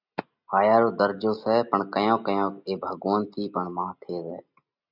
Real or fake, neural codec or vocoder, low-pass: real; none; 5.4 kHz